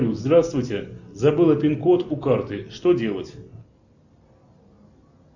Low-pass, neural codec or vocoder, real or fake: 7.2 kHz; none; real